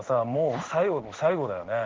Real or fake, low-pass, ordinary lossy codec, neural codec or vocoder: fake; 7.2 kHz; Opus, 16 kbps; codec, 16 kHz in and 24 kHz out, 1 kbps, XY-Tokenizer